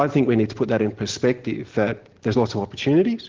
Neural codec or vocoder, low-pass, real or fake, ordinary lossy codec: none; 7.2 kHz; real; Opus, 16 kbps